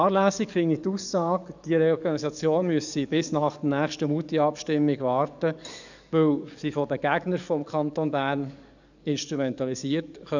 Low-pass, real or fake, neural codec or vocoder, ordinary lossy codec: 7.2 kHz; fake; codec, 44.1 kHz, 7.8 kbps, DAC; none